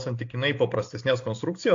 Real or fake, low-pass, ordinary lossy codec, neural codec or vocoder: real; 7.2 kHz; AAC, 48 kbps; none